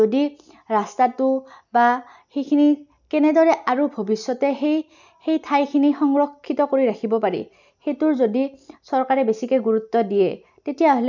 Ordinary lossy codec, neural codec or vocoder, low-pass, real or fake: none; none; 7.2 kHz; real